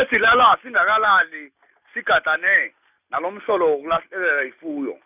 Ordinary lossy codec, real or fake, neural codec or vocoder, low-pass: none; real; none; 3.6 kHz